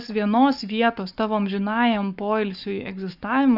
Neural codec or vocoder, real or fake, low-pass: codec, 44.1 kHz, 7.8 kbps, Pupu-Codec; fake; 5.4 kHz